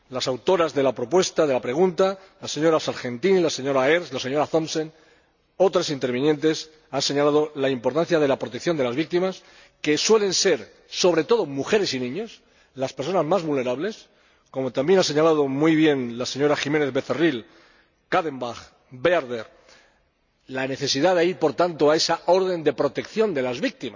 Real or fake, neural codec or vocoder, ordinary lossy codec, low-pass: real; none; none; 7.2 kHz